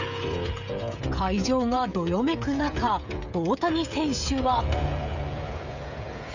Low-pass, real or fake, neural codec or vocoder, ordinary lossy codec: 7.2 kHz; fake; codec, 16 kHz, 16 kbps, FreqCodec, smaller model; none